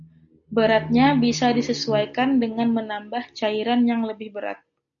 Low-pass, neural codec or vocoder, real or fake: 7.2 kHz; none; real